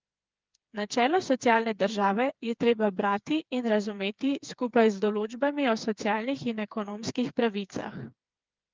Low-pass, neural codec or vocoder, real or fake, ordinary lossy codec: 7.2 kHz; codec, 16 kHz, 4 kbps, FreqCodec, smaller model; fake; Opus, 24 kbps